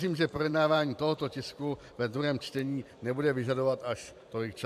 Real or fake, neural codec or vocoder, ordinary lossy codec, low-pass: fake; vocoder, 44.1 kHz, 128 mel bands every 512 samples, BigVGAN v2; AAC, 96 kbps; 14.4 kHz